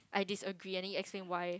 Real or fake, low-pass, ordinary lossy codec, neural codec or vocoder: real; none; none; none